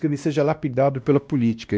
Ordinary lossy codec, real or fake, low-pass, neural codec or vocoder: none; fake; none; codec, 16 kHz, 1 kbps, X-Codec, WavLM features, trained on Multilingual LibriSpeech